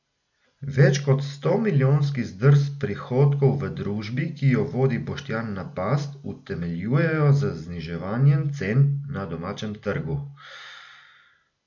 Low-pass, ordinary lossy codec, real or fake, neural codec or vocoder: 7.2 kHz; none; real; none